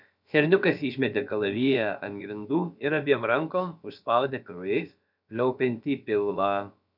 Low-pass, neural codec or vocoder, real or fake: 5.4 kHz; codec, 16 kHz, about 1 kbps, DyCAST, with the encoder's durations; fake